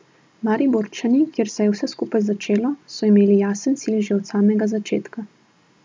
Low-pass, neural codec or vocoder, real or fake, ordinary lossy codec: none; none; real; none